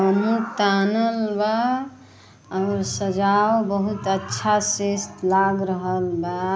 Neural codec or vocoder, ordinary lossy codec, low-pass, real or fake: none; none; none; real